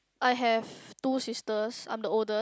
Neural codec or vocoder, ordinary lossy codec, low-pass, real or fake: none; none; none; real